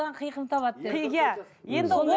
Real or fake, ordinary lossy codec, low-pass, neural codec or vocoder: real; none; none; none